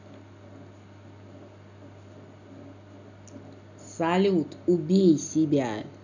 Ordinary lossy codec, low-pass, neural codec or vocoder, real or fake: none; 7.2 kHz; none; real